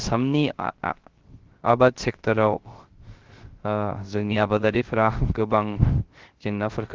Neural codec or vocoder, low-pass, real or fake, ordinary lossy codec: codec, 16 kHz, 0.3 kbps, FocalCodec; 7.2 kHz; fake; Opus, 16 kbps